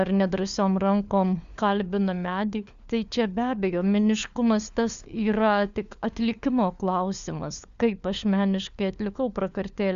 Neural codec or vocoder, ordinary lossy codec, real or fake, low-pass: codec, 16 kHz, 4 kbps, FunCodec, trained on LibriTTS, 50 frames a second; Opus, 64 kbps; fake; 7.2 kHz